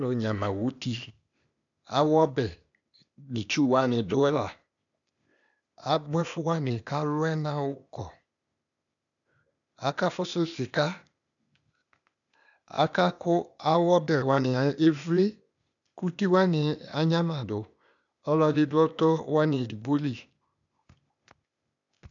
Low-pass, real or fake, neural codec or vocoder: 7.2 kHz; fake; codec, 16 kHz, 0.8 kbps, ZipCodec